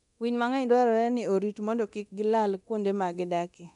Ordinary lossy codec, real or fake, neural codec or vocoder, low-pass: none; fake; codec, 24 kHz, 0.9 kbps, DualCodec; 10.8 kHz